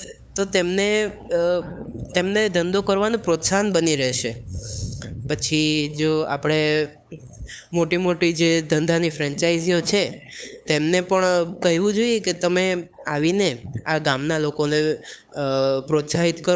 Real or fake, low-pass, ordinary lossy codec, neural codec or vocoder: fake; none; none; codec, 16 kHz, 8 kbps, FunCodec, trained on LibriTTS, 25 frames a second